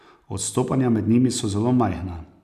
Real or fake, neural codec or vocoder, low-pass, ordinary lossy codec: real; none; 14.4 kHz; none